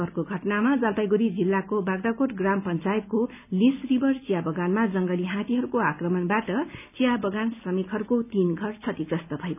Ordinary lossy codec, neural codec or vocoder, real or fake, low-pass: none; none; real; 3.6 kHz